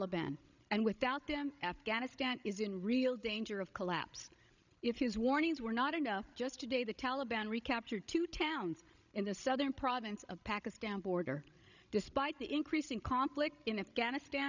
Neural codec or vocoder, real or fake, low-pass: codec, 16 kHz, 16 kbps, FreqCodec, larger model; fake; 7.2 kHz